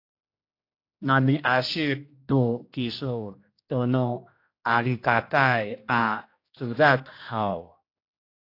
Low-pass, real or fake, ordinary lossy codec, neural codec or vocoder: 5.4 kHz; fake; AAC, 32 kbps; codec, 16 kHz, 1 kbps, X-Codec, HuBERT features, trained on general audio